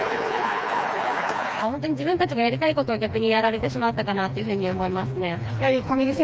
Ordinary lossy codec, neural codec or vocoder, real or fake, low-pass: none; codec, 16 kHz, 2 kbps, FreqCodec, smaller model; fake; none